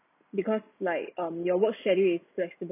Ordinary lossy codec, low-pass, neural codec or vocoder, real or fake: none; 3.6 kHz; none; real